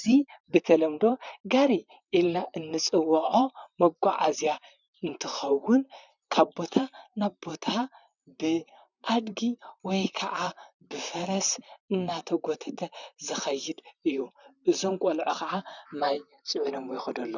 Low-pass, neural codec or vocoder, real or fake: 7.2 kHz; vocoder, 44.1 kHz, 128 mel bands, Pupu-Vocoder; fake